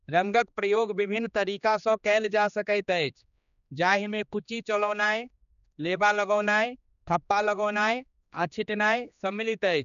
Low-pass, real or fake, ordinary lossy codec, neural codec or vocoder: 7.2 kHz; fake; none; codec, 16 kHz, 2 kbps, X-Codec, HuBERT features, trained on general audio